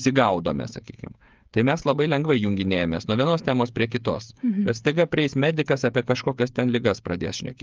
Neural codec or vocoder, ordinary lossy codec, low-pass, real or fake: codec, 16 kHz, 8 kbps, FreqCodec, smaller model; Opus, 24 kbps; 7.2 kHz; fake